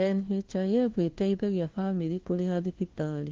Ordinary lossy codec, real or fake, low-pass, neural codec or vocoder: Opus, 16 kbps; fake; 7.2 kHz; codec, 16 kHz, 1 kbps, FunCodec, trained on LibriTTS, 50 frames a second